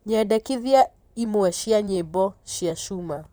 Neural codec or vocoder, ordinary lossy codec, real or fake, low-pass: vocoder, 44.1 kHz, 128 mel bands, Pupu-Vocoder; none; fake; none